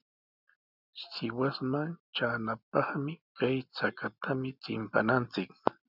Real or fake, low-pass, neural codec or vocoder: real; 5.4 kHz; none